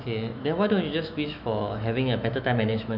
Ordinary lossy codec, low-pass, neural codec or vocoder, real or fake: none; 5.4 kHz; autoencoder, 48 kHz, 128 numbers a frame, DAC-VAE, trained on Japanese speech; fake